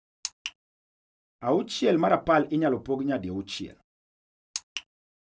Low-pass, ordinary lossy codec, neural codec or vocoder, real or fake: none; none; none; real